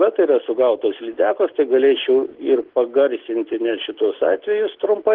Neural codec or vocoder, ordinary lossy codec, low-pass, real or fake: none; Opus, 16 kbps; 5.4 kHz; real